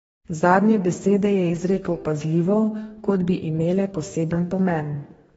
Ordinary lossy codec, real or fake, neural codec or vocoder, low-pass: AAC, 24 kbps; fake; codec, 44.1 kHz, 2.6 kbps, DAC; 19.8 kHz